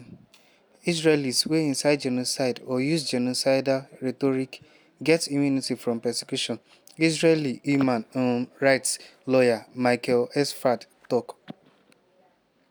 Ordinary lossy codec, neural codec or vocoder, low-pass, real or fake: none; none; none; real